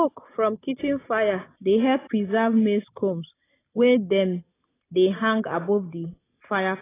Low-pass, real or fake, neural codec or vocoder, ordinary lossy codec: 3.6 kHz; real; none; AAC, 16 kbps